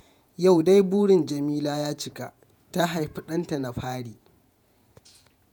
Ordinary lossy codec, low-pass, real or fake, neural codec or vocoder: none; none; fake; vocoder, 48 kHz, 128 mel bands, Vocos